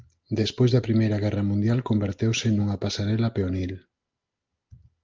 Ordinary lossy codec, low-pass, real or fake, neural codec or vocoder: Opus, 32 kbps; 7.2 kHz; real; none